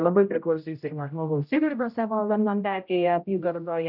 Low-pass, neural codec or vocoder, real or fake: 5.4 kHz; codec, 16 kHz, 0.5 kbps, X-Codec, HuBERT features, trained on general audio; fake